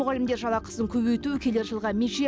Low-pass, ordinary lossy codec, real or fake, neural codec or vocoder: none; none; real; none